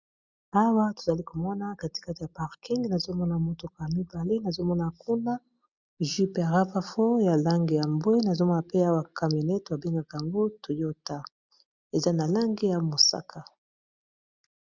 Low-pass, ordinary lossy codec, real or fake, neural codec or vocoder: 7.2 kHz; Opus, 64 kbps; real; none